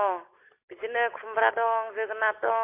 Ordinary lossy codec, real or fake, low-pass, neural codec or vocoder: MP3, 24 kbps; real; 3.6 kHz; none